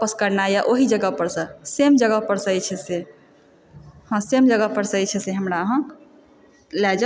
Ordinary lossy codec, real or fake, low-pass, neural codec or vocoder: none; real; none; none